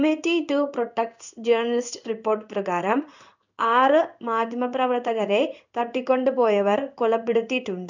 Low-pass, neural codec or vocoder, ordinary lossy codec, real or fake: 7.2 kHz; codec, 16 kHz in and 24 kHz out, 1 kbps, XY-Tokenizer; none; fake